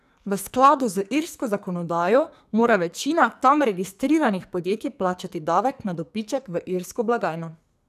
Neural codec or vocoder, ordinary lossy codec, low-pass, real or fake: codec, 44.1 kHz, 2.6 kbps, SNAC; none; 14.4 kHz; fake